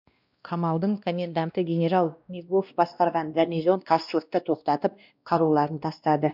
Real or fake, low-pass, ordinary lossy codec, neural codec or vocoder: fake; 5.4 kHz; none; codec, 16 kHz, 1 kbps, X-Codec, WavLM features, trained on Multilingual LibriSpeech